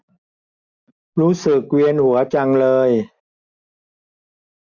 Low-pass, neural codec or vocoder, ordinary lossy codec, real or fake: 7.2 kHz; none; none; real